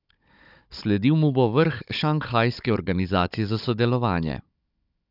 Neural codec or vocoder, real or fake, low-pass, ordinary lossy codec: codec, 16 kHz, 16 kbps, FunCodec, trained on Chinese and English, 50 frames a second; fake; 5.4 kHz; none